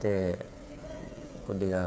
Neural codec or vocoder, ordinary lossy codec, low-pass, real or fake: codec, 16 kHz, 8 kbps, FreqCodec, smaller model; none; none; fake